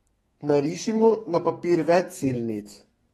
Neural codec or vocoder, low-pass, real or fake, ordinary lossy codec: codec, 32 kHz, 1.9 kbps, SNAC; 14.4 kHz; fake; AAC, 32 kbps